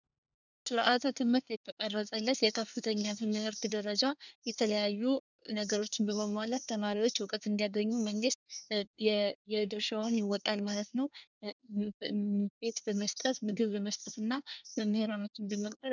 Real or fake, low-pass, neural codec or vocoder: fake; 7.2 kHz; codec, 24 kHz, 1 kbps, SNAC